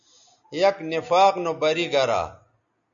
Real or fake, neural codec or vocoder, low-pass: real; none; 7.2 kHz